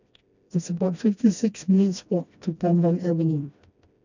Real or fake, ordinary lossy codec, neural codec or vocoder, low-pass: fake; none; codec, 16 kHz, 1 kbps, FreqCodec, smaller model; 7.2 kHz